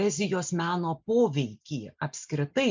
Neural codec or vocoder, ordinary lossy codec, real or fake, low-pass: none; MP3, 64 kbps; real; 7.2 kHz